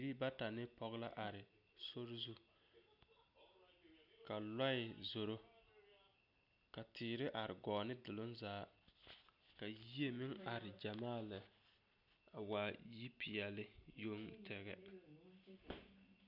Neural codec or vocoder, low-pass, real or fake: none; 5.4 kHz; real